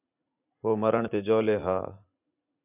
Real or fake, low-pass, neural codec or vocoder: fake; 3.6 kHz; vocoder, 44.1 kHz, 80 mel bands, Vocos